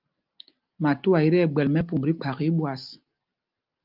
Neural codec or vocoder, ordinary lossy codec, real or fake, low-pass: none; Opus, 24 kbps; real; 5.4 kHz